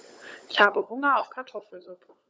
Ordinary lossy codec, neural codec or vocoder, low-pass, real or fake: none; codec, 16 kHz, 16 kbps, FunCodec, trained on LibriTTS, 50 frames a second; none; fake